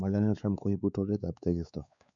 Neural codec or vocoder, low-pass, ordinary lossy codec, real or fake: codec, 16 kHz, 4 kbps, X-Codec, WavLM features, trained on Multilingual LibriSpeech; 7.2 kHz; none; fake